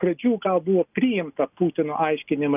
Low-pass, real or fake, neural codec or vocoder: 3.6 kHz; real; none